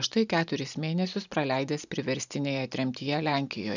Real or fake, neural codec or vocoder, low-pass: real; none; 7.2 kHz